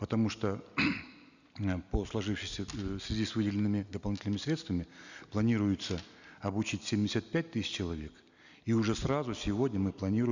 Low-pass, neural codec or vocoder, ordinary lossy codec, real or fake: 7.2 kHz; none; none; real